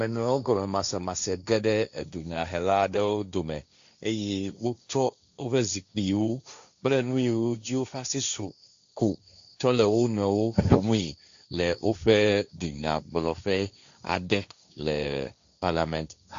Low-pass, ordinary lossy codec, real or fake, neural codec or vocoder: 7.2 kHz; AAC, 64 kbps; fake; codec, 16 kHz, 1.1 kbps, Voila-Tokenizer